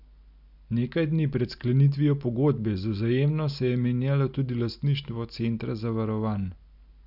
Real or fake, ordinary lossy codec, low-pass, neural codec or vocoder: real; none; 5.4 kHz; none